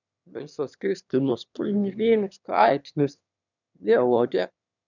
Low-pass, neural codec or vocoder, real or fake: 7.2 kHz; autoencoder, 22.05 kHz, a latent of 192 numbers a frame, VITS, trained on one speaker; fake